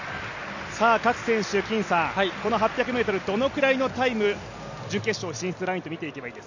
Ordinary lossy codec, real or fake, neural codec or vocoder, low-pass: none; real; none; 7.2 kHz